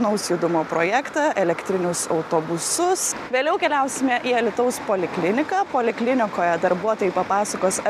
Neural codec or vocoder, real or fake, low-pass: none; real; 14.4 kHz